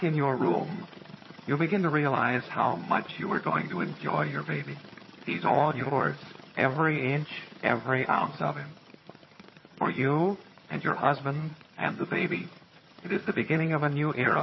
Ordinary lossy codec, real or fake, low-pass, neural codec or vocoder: MP3, 24 kbps; fake; 7.2 kHz; vocoder, 22.05 kHz, 80 mel bands, HiFi-GAN